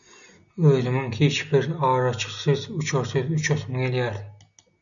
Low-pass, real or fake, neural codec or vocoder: 7.2 kHz; real; none